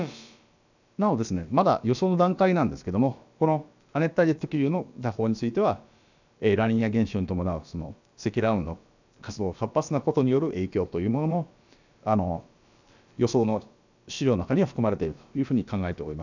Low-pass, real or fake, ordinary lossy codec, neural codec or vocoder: 7.2 kHz; fake; none; codec, 16 kHz, about 1 kbps, DyCAST, with the encoder's durations